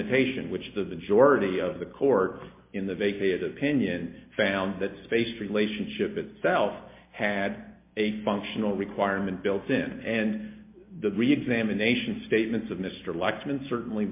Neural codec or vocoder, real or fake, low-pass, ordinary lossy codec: none; real; 3.6 kHz; MP3, 24 kbps